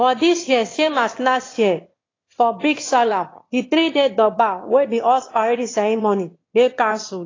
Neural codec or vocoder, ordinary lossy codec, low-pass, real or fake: autoencoder, 22.05 kHz, a latent of 192 numbers a frame, VITS, trained on one speaker; AAC, 32 kbps; 7.2 kHz; fake